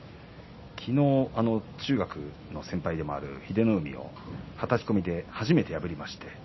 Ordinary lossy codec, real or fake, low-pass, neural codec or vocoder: MP3, 24 kbps; real; 7.2 kHz; none